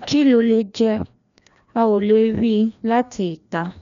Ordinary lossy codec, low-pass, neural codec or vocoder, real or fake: none; 7.2 kHz; codec, 16 kHz, 1 kbps, FreqCodec, larger model; fake